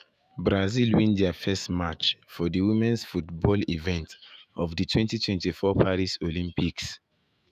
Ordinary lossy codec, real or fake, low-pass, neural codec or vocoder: none; fake; 14.4 kHz; autoencoder, 48 kHz, 128 numbers a frame, DAC-VAE, trained on Japanese speech